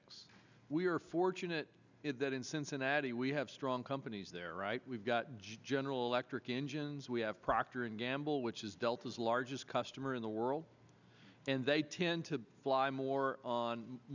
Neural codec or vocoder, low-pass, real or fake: none; 7.2 kHz; real